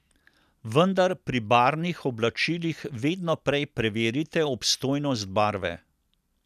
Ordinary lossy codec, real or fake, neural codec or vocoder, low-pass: none; real; none; 14.4 kHz